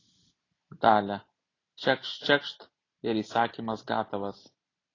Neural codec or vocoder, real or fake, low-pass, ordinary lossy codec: none; real; 7.2 kHz; AAC, 32 kbps